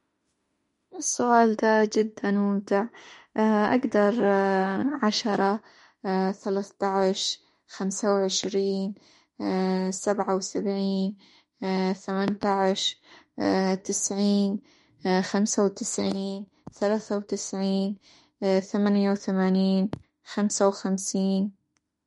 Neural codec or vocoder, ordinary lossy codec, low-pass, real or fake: autoencoder, 48 kHz, 32 numbers a frame, DAC-VAE, trained on Japanese speech; MP3, 48 kbps; 19.8 kHz; fake